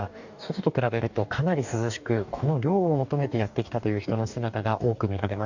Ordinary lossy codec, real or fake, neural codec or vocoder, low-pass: none; fake; codec, 44.1 kHz, 2.6 kbps, DAC; 7.2 kHz